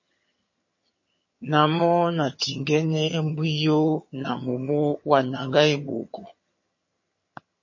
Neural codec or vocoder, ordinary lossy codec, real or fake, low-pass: vocoder, 22.05 kHz, 80 mel bands, HiFi-GAN; MP3, 32 kbps; fake; 7.2 kHz